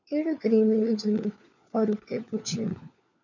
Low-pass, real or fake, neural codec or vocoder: 7.2 kHz; fake; codec, 16 kHz, 4 kbps, FunCodec, trained on LibriTTS, 50 frames a second